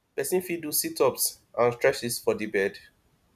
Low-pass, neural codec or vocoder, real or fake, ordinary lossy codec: 14.4 kHz; none; real; none